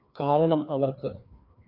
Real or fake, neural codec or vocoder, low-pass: fake; codec, 16 kHz, 2 kbps, FreqCodec, larger model; 5.4 kHz